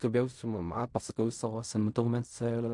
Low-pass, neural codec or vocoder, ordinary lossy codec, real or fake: 10.8 kHz; codec, 16 kHz in and 24 kHz out, 0.4 kbps, LongCat-Audio-Codec, fine tuned four codebook decoder; MP3, 64 kbps; fake